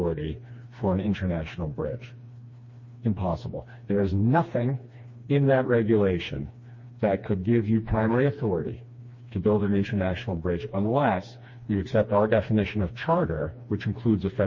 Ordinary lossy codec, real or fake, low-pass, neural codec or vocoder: MP3, 32 kbps; fake; 7.2 kHz; codec, 16 kHz, 2 kbps, FreqCodec, smaller model